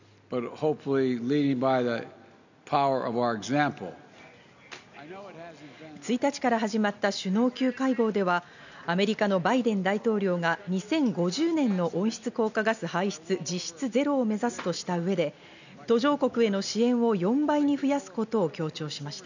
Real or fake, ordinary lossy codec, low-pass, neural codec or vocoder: real; none; 7.2 kHz; none